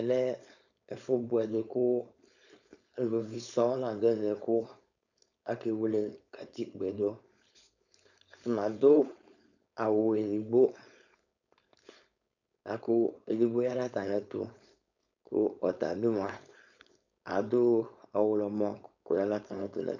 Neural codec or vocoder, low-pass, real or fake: codec, 16 kHz, 4.8 kbps, FACodec; 7.2 kHz; fake